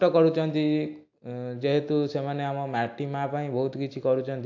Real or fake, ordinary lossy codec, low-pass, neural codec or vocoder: real; AAC, 48 kbps; 7.2 kHz; none